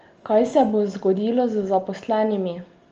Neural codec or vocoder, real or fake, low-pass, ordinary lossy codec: none; real; 7.2 kHz; Opus, 24 kbps